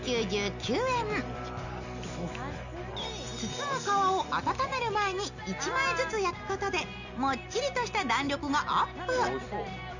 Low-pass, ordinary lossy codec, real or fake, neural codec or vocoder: 7.2 kHz; none; real; none